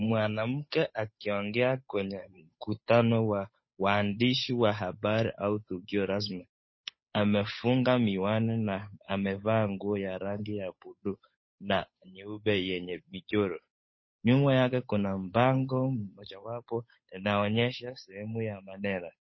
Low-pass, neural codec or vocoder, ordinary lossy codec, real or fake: 7.2 kHz; codec, 16 kHz, 8 kbps, FunCodec, trained on Chinese and English, 25 frames a second; MP3, 24 kbps; fake